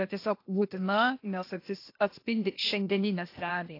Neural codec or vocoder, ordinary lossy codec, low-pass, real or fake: codec, 16 kHz, 0.8 kbps, ZipCodec; AAC, 32 kbps; 5.4 kHz; fake